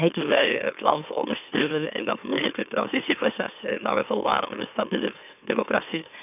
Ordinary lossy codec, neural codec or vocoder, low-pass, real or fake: none; autoencoder, 44.1 kHz, a latent of 192 numbers a frame, MeloTTS; 3.6 kHz; fake